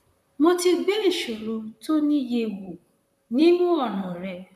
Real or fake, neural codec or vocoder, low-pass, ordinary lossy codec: fake; vocoder, 44.1 kHz, 128 mel bands, Pupu-Vocoder; 14.4 kHz; none